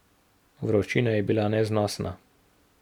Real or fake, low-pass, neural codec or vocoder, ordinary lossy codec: real; 19.8 kHz; none; none